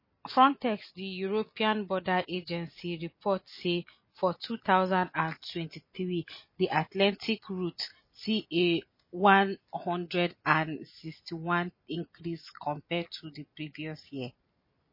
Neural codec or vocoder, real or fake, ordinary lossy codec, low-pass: none; real; MP3, 24 kbps; 5.4 kHz